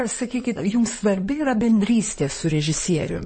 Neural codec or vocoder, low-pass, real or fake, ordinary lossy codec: codec, 16 kHz in and 24 kHz out, 2.2 kbps, FireRedTTS-2 codec; 9.9 kHz; fake; MP3, 32 kbps